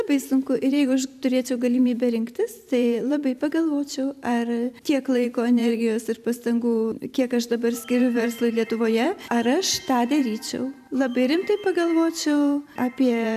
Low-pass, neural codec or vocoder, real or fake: 14.4 kHz; vocoder, 44.1 kHz, 128 mel bands every 512 samples, BigVGAN v2; fake